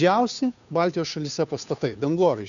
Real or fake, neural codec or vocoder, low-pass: fake; codec, 16 kHz, 2 kbps, FunCodec, trained on Chinese and English, 25 frames a second; 7.2 kHz